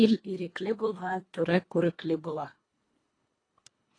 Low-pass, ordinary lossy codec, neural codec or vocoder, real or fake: 9.9 kHz; AAC, 32 kbps; codec, 24 kHz, 1.5 kbps, HILCodec; fake